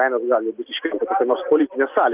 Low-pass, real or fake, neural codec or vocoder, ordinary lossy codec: 3.6 kHz; real; none; Opus, 32 kbps